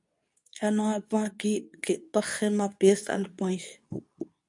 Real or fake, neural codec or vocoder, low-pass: fake; codec, 24 kHz, 0.9 kbps, WavTokenizer, medium speech release version 2; 10.8 kHz